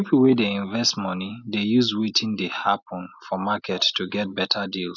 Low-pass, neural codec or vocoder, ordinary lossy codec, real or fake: 7.2 kHz; none; none; real